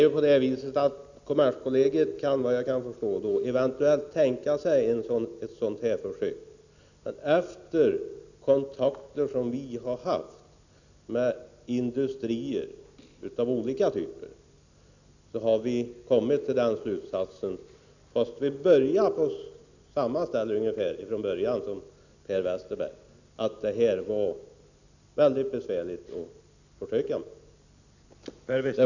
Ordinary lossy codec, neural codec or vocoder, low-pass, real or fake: none; none; 7.2 kHz; real